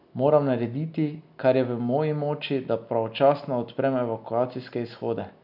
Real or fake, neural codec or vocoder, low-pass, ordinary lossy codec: real; none; 5.4 kHz; none